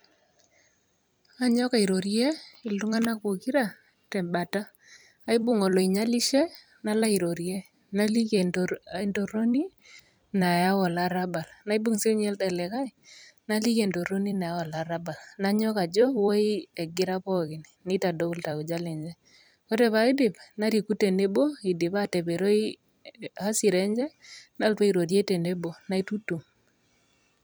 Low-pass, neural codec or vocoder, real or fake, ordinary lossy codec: none; vocoder, 44.1 kHz, 128 mel bands every 256 samples, BigVGAN v2; fake; none